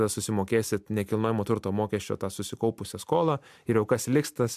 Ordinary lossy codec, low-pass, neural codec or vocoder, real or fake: MP3, 96 kbps; 14.4 kHz; none; real